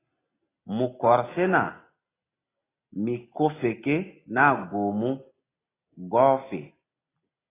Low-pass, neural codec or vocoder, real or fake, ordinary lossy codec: 3.6 kHz; none; real; AAC, 16 kbps